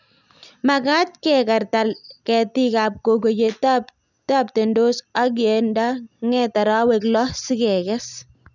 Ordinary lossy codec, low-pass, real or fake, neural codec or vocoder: none; 7.2 kHz; real; none